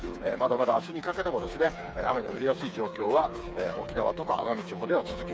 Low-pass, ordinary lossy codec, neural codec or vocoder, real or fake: none; none; codec, 16 kHz, 4 kbps, FreqCodec, smaller model; fake